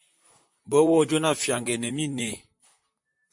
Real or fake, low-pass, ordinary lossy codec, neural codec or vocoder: fake; 10.8 kHz; MP3, 48 kbps; vocoder, 44.1 kHz, 128 mel bands, Pupu-Vocoder